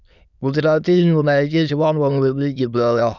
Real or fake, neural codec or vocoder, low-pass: fake; autoencoder, 22.05 kHz, a latent of 192 numbers a frame, VITS, trained on many speakers; 7.2 kHz